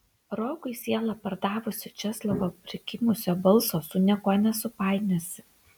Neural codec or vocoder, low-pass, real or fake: none; 19.8 kHz; real